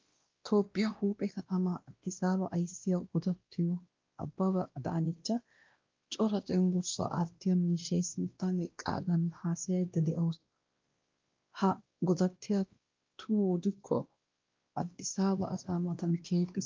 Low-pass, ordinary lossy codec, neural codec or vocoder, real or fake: 7.2 kHz; Opus, 32 kbps; codec, 16 kHz, 1 kbps, X-Codec, WavLM features, trained on Multilingual LibriSpeech; fake